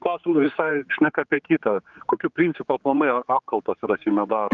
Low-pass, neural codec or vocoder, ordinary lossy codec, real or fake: 7.2 kHz; codec, 16 kHz, 4 kbps, X-Codec, HuBERT features, trained on general audio; Opus, 32 kbps; fake